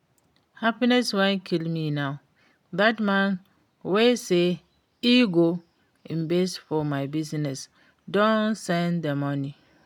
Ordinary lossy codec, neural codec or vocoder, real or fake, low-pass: none; none; real; 19.8 kHz